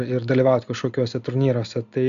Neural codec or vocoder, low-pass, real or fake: none; 7.2 kHz; real